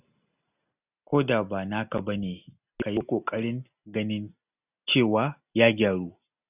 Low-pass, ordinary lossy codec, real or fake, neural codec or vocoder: 3.6 kHz; none; real; none